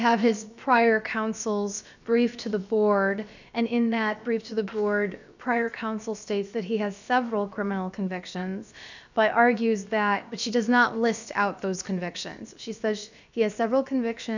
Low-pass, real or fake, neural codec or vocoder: 7.2 kHz; fake; codec, 16 kHz, about 1 kbps, DyCAST, with the encoder's durations